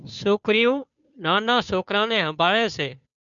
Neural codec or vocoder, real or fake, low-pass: codec, 16 kHz, 2 kbps, FunCodec, trained on Chinese and English, 25 frames a second; fake; 7.2 kHz